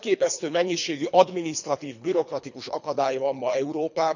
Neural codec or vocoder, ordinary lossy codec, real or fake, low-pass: codec, 24 kHz, 3 kbps, HILCodec; none; fake; 7.2 kHz